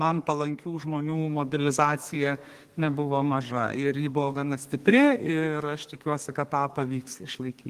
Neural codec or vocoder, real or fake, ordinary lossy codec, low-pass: codec, 32 kHz, 1.9 kbps, SNAC; fake; Opus, 24 kbps; 14.4 kHz